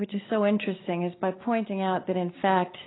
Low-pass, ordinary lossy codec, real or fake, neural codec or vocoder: 7.2 kHz; AAC, 16 kbps; real; none